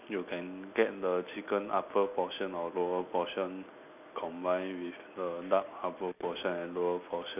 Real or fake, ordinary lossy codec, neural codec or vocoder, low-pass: real; none; none; 3.6 kHz